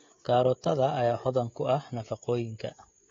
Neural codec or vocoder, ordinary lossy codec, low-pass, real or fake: codec, 16 kHz, 16 kbps, FreqCodec, smaller model; AAC, 32 kbps; 7.2 kHz; fake